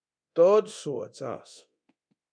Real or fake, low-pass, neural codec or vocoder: fake; 9.9 kHz; codec, 24 kHz, 0.9 kbps, DualCodec